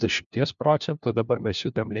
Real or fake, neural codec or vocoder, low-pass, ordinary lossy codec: fake; codec, 16 kHz, 1 kbps, FunCodec, trained on LibriTTS, 50 frames a second; 7.2 kHz; MP3, 64 kbps